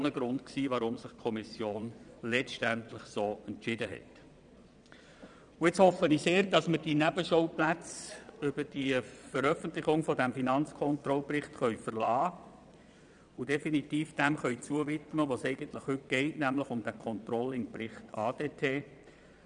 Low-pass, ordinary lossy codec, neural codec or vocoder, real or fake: 9.9 kHz; none; vocoder, 22.05 kHz, 80 mel bands, Vocos; fake